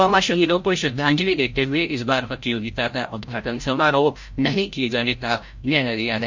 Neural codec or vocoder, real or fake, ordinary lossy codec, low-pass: codec, 16 kHz, 0.5 kbps, FreqCodec, larger model; fake; MP3, 48 kbps; 7.2 kHz